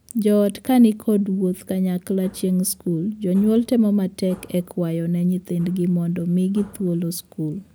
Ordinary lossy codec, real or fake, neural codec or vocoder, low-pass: none; real; none; none